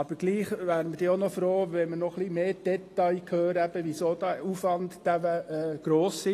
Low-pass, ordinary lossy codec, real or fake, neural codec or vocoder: 14.4 kHz; AAC, 48 kbps; real; none